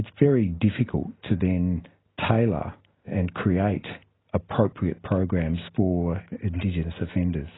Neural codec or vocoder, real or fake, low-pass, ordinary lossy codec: none; real; 7.2 kHz; AAC, 16 kbps